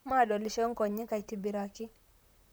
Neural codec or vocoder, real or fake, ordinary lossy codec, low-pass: vocoder, 44.1 kHz, 128 mel bands, Pupu-Vocoder; fake; none; none